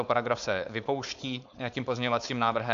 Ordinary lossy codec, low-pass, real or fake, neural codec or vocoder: MP3, 64 kbps; 7.2 kHz; fake; codec, 16 kHz, 4.8 kbps, FACodec